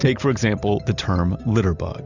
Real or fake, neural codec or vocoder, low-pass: real; none; 7.2 kHz